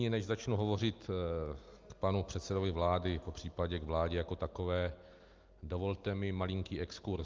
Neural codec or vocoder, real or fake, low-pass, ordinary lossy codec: none; real; 7.2 kHz; Opus, 32 kbps